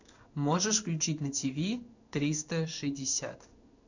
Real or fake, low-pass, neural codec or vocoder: fake; 7.2 kHz; codec, 16 kHz in and 24 kHz out, 1 kbps, XY-Tokenizer